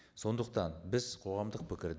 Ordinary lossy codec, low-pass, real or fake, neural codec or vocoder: none; none; real; none